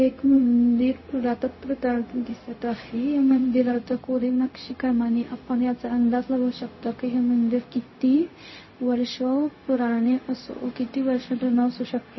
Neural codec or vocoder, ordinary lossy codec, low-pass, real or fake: codec, 16 kHz, 0.4 kbps, LongCat-Audio-Codec; MP3, 24 kbps; 7.2 kHz; fake